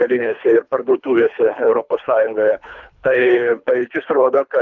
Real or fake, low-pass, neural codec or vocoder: fake; 7.2 kHz; codec, 24 kHz, 3 kbps, HILCodec